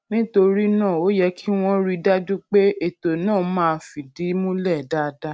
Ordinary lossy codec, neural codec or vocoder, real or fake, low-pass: none; none; real; none